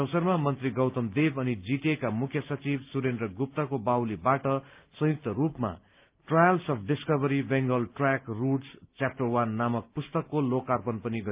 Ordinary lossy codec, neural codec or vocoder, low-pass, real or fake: Opus, 24 kbps; none; 3.6 kHz; real